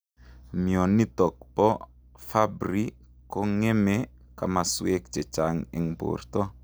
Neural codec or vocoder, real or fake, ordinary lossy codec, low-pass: none; real; none; none